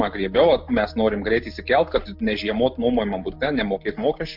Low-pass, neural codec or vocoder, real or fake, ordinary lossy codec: 5.4 kHz; none; real; MP3, 48 kbps